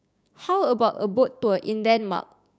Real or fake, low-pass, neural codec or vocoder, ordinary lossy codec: fake; none; codec, 16 kHz, 6 kbps, DAC; none